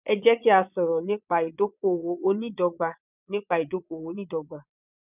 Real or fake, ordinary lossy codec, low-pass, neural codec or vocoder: fake; none; 3.6 kHz; vocoder, 22.05 kHz, 80 mel bands, Vocos